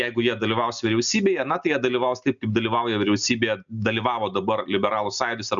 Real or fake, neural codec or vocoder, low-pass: real; none; 7.2 kHz